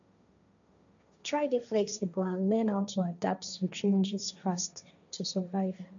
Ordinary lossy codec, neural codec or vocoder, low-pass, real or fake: none; codec, 16 kHz, 1.1 kbps, Voila-Tokenizer; 7.2 kHz; fake